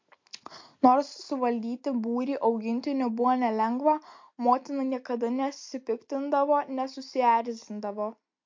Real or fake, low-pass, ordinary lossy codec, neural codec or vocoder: real; 7.2 kHz; MP3, 48 kbps; none